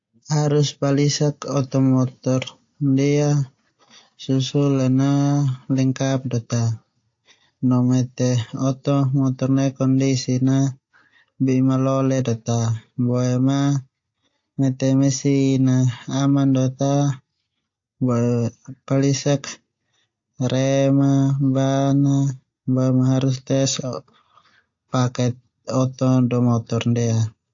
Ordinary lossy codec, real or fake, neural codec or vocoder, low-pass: AAC, 48 kbps; real; none; 7.2 kHz